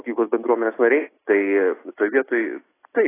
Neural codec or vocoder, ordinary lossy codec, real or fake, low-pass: none; AAC, 16 kbps; real; 3.6 kHz